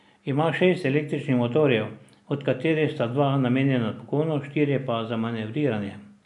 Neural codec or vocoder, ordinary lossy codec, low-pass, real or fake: none; none; 10.8 kHz; real